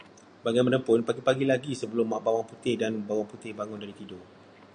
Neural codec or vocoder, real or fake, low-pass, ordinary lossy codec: none; real; 9.9 kHz; AAC, 64 kbps